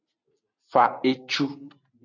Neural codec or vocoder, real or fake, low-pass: none; real; 7.2 kHz